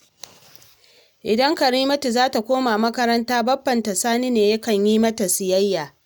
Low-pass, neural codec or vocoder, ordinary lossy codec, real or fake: none; none; none; real